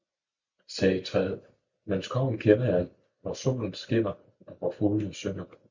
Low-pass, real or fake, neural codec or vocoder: 7.2 kHz; real; none